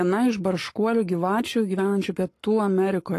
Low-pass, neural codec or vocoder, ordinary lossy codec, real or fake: 14.4 kHz; codec, 44.1 kHz, 7.8 kbps, Pupu-Codec; AAC, 48 kbps; fake